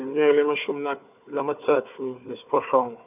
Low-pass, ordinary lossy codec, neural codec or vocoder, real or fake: 3.6 kHz; none; codec, 16 kHz, 4 kbps, FunCodec, trained on Chinese and English, 50 frames a second; fake